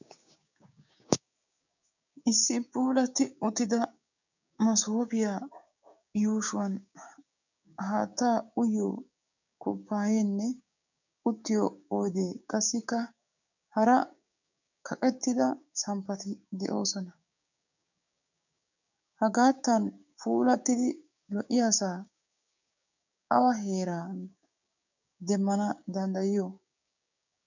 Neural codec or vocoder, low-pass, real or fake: codec, 16 kHz, 6 kbps, DAC; 7.2 kHz; fake